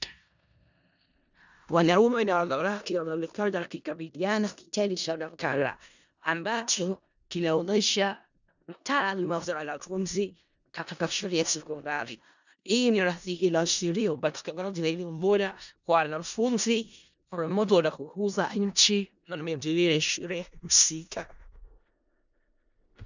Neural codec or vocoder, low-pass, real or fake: codec, 16 kHz in and 24 kHz out, 0.4 kbps, LongCat-Audio-Codec, four codebook decoder; 7.2 kHz; fake